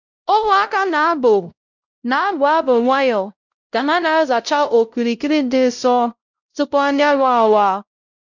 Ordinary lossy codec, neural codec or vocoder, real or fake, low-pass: none; codec, 16 kHz, 0.5 kbps, X-Codec, WavLM features, trained on Multilingual LibriSpeech; fake; 7.2 kHz